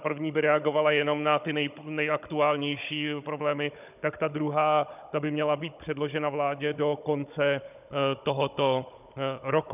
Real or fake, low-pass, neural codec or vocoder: fake; 3.6 kHz; codec, 16 kHz, 16 kbps, FunCodec, trained on Chinese and English, 50 frames a second